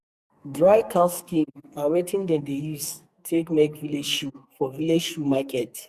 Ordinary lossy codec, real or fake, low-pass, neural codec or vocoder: Opus, 64 kbps; fake; 14.4 kHz; codec, 44.1 kHz, 2.6 kbps, SNAC